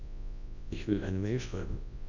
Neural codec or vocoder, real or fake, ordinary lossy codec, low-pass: codec, 24 kHz, 0.9 kbps, WavTokenizer, large speech release; fake; none; 7.2 kHz